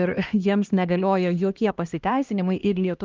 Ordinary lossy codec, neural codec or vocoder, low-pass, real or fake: Opus, 32 kbps; codec, 16 kHz, 1 kbps, X-Codec, HuBERT features, trained on LibriSpeech; 7.2 kHz; fake